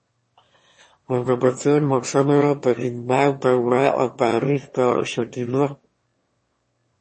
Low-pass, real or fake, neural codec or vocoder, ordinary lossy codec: 9.9 kHz; fake; autoencoder, 22.05 kHz, a latent of 192 numbers a frame, VITS, trained on one speaker; MP3, 32 kbps